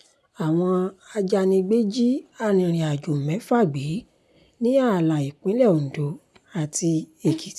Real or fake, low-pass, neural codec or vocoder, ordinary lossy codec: real; none; none; none